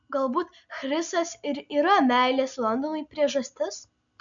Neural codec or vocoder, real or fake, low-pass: none; real; 7.2 kHz